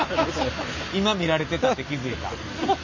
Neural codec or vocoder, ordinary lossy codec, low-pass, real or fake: none; none; 7.2 kHz; real